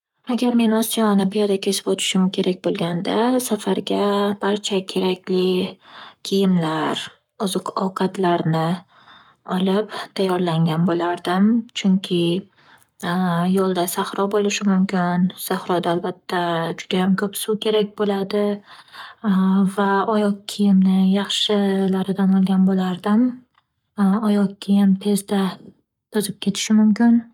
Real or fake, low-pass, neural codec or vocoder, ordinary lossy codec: fake; 19.8 kHz; codec, 44.1 kHz, 7.8 kbps, Pupu-Codec; none